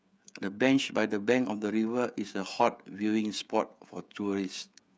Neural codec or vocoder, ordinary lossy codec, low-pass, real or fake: codec, 16 kHz, 16 kbps, FreqCodec, smaller model; none; none; fake